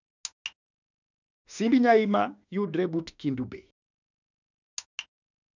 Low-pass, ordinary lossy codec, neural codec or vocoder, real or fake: 7.2 kHz; none; autoencoder, 48 kHz, 32 numbers a frame, DAC-VAE, trained on Japanese speech; fake